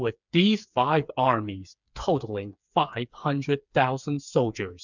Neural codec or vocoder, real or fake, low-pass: codec, 16 kHz, 4 kbps, FreqCodec, smaller model; fake; 7.2 kHz